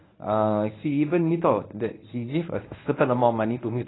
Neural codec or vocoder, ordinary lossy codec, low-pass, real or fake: codec, 24 kHz, 0.9 kbps, WavTokenizer, medium speech release version 1; AAC, 16 kbps; 7.2 kHz; fake